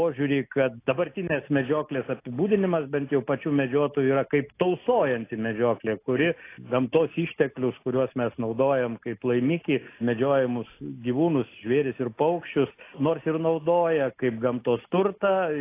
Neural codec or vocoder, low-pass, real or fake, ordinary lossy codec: none; 3.6 kHz; real; AAC, 24 kbps